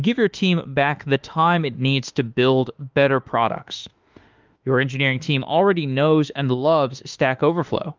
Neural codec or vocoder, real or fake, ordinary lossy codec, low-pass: autoencoder, 48 kHz, 32 numbers a frame, DAC-VAE, trained on Japanese speech; fake; Opus, 24 kbps; 7.2 kHz